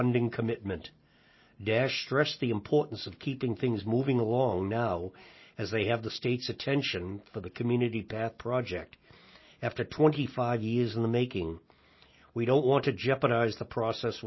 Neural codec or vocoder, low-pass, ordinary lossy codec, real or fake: none; 7.2 kHz; MP3, 24 kbps; real